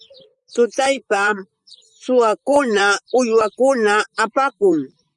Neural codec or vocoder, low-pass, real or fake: vocoder, 44.1 kHz, 128 mel bands, Pupu-Vocoder; 10.8 kHz; fake